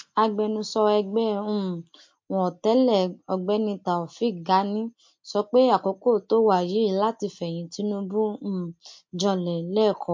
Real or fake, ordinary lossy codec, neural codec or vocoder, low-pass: real; MP3, 48 kbps; none; 7.2 kHz